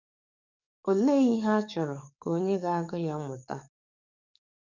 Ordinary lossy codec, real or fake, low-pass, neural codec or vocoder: none; fake; 7.2 kHz; codec, 44.1 kHz, 7.8 kbps, DAC